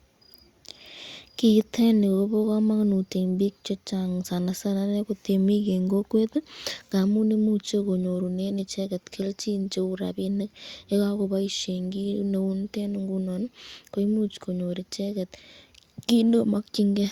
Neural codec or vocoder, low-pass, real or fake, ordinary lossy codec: none; 19.8 kHz; real; Opus, 64 kbps